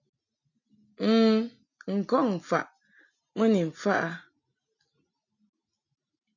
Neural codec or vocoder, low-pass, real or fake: none; 7.2 kHz; real